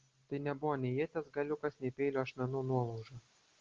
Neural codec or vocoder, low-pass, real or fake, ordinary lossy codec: none; 7.2 kHz; real; Opus, 16 kbps